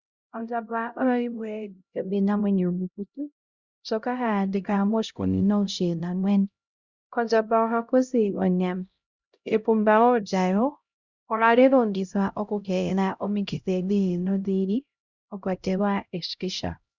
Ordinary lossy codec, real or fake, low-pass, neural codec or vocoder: Opus, 64 kbps; fake; 7.2 kHz; codec, 16 kHz, 0.5 kbps, X-Codec, HuBERT features, trained on LibriSpeech